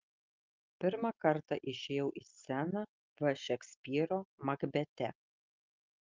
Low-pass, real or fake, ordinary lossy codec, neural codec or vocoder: 7.2 kHz; real; Opus, 24 kbps; none